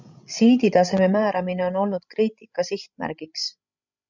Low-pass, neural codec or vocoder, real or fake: 7.2 kHz; codec, 16 kHz, 16 kbps, FreqCodec, larger model; fake